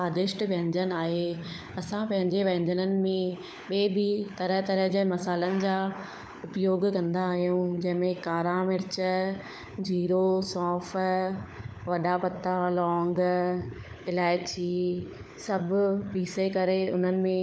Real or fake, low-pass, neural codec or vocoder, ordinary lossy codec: fake; none; codec, 16 kHz, 16 kbps, FunCodec, trained on LibriTTS, 50 frames a second; none